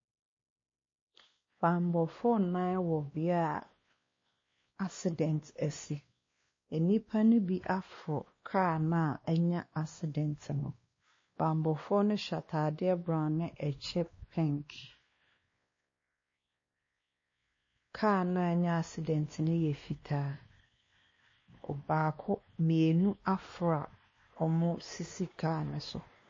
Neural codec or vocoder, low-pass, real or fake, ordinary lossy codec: codec, 16 kHz, 2 kbps, X-Codec, WavLM features, trained on Multilingual LibriSpeech; 7.2 kHz; fake; MP3, 32 kbps